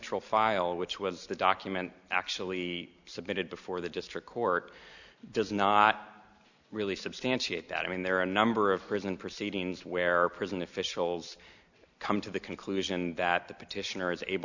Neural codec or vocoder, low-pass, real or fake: none; 7.2 kHz; real